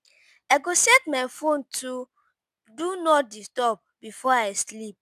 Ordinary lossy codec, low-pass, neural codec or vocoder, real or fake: none; 14.4 kHz; none; real